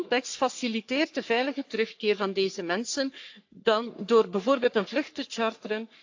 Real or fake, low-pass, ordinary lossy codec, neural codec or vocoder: fake; 7.2 kHz; AAC, 48 kbps; codec, 44.1 kHz, 3.4 kbps, Pupu-Codec